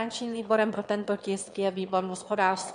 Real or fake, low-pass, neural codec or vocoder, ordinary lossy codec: fake; 9.9 kHz; autoencoder, 22.05 kHz, a latent of 192 numbers a frame, VITS, trained on one speaker; MP3, 64 kbps